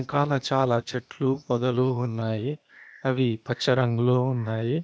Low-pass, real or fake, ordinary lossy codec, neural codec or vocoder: none; fake; none; codec, 16 kHz, 0.8 kbps, ZipCodec